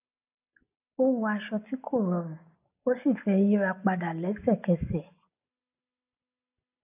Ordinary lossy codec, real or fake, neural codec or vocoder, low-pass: AAC, 32 kbps; real; none; 3.6 kHz